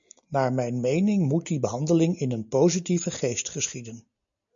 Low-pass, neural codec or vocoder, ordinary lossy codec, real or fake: 7.2 kHz; none; MP3, 64 kbps; real